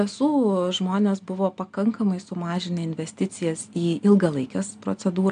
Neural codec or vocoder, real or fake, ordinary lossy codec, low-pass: none; real; AAC, 64 kbps; 9.9 kHz